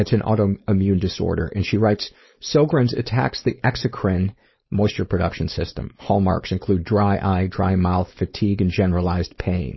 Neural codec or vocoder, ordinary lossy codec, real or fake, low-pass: codec, 16 kHz, 4.8 kbps, FACodec; MP3, 24 kbps; fake; 7.2 kHz